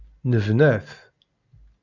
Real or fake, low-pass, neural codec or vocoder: real; 7.2 kHz; none